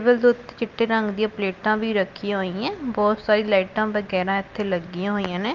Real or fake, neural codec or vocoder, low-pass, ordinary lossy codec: real; none; 7.2 kHz; Opus, 32 kbps